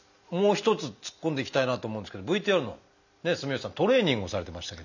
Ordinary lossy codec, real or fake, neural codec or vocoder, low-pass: none; real; none; 7.2 kHz